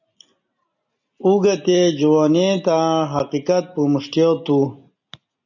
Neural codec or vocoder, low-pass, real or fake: none; 7.2 kHz; real